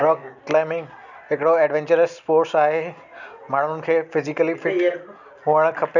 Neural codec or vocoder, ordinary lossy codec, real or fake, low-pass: none; none; real; 7.2 kHz